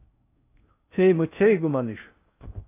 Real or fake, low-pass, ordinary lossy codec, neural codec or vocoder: fake; 3.6 kHz; AAC, 24 kbps; codec, 16 kHz in and 24 kHz out, 0.6 kbps, FocalCodec, streaming, 4096 codes